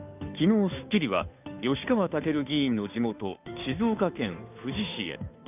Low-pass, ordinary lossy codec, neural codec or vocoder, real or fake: 3.6 kHz; none; codec, 16 kHz, 2 kbps, FunCodec, trained on Chinese and English, 25 frames a second; fake